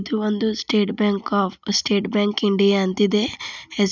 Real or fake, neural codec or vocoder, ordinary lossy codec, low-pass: real; none; none; 7.2 kHz